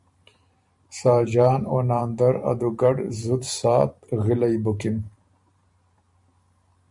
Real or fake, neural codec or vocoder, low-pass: fake; vocoder, 44.1 kHz, 128 mel bands every 512 samples, BigVGAN v2; 10.8 kHz